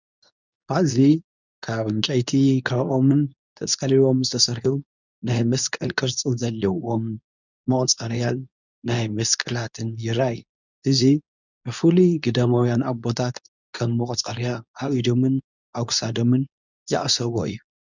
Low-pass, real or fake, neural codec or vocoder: 7.2 kHz; fake; codec, 24 kHz, 0.9 kbps, WavTokenizer, medium speech release version 2